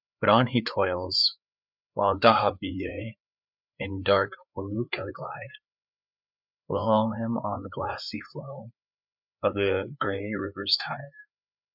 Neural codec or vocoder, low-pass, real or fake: codec, 16 kHz, 4 kbps, FreqCodec, larger model; 5.4 kHz; fake